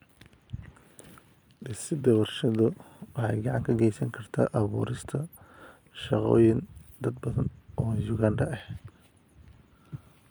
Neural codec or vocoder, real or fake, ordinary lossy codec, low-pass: vocoder, 44.1 kHz, 128 mel bands every 256 samples, BigVGAN v2; fake; none; none